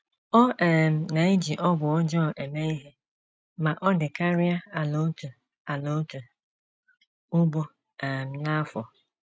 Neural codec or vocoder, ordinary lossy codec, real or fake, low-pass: none; none; real; none